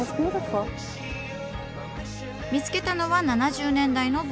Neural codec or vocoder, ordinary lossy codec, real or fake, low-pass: none; none; real; none